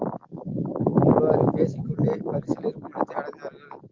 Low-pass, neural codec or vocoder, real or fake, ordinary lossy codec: 7.2 kHz; none; real; Opus, 32 kbps